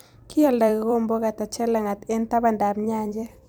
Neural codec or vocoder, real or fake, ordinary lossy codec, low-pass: vocoder, 44.1 kHz, 128 mel bands every 256 samples, BigVGAN v2; fake; none; none